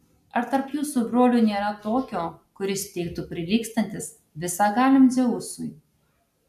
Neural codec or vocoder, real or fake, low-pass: none; real; 14.4 kHz